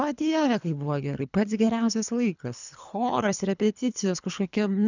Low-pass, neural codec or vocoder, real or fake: 7.2 kHz; codec, 24 kHz, 3 kbps, HILCodec; fake